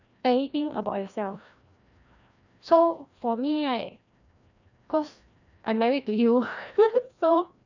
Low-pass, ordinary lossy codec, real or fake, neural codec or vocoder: 7.2 kHz; none; fake; codec, 16 kHz, 1 kbps, FreqCodec, larger model